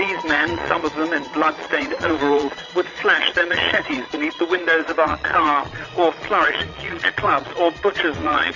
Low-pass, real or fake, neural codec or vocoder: 7.2 kHz; fake; vocoder, 22.05 kHz, 80 mel bands, Vocos